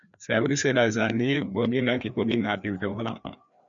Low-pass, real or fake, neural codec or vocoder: 7.2 kHz; fake; codec, 16 kHz, 2 kbps, FreqCodec, larger model